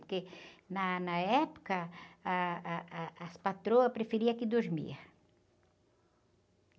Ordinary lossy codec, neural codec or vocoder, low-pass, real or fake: none; none; none; real